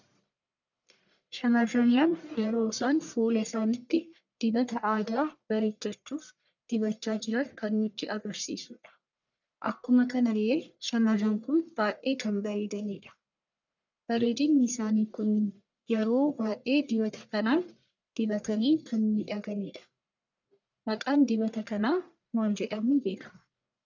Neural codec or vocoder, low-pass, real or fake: codec, 44.1 kHz, 1.7 kbps, Pupu-Codec; 7.2 kHz; fake